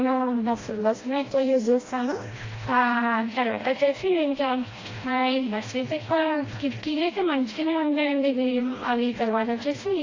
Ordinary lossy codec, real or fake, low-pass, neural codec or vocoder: AAC, 32 kbps; fake; 7.2 kHz; codec, 16 kHz, 1 kbps, FreqCodec, smaller model